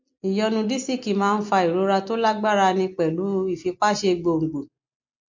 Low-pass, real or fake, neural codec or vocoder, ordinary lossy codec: 7.2 kHz; real; none; MP3, 48 kbps